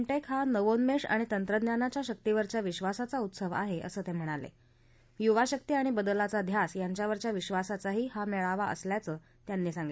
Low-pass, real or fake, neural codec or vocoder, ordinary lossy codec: none; real; none; none